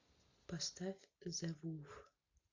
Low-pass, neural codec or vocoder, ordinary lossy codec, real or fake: 7.2 kHz; none; AAC, 48 kbps; real